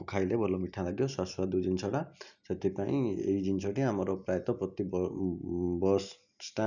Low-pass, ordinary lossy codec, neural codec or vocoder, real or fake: 7.2 kHz; none; none; real